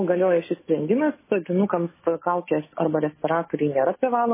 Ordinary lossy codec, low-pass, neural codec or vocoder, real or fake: MP3, 16 kbps; 3.6 kHz; autoencoder, 48 kHz, 128 numbers a frame, DAC-VAE, trained on Japanese speech; fake